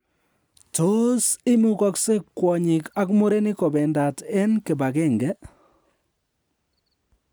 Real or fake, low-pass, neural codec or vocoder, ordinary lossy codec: real; none; none; none